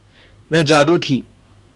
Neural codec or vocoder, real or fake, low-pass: codec, 24 kHz, 1 kbps, SNAC; fake; 10.8 kHz